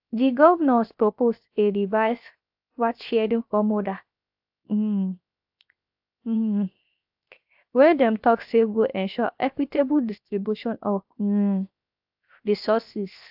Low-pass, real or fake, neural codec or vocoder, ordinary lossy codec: 5.4 kHz; fake; codec, 16 kHz, 0.7 kbps, FocalCodec; AAC, 48 kbps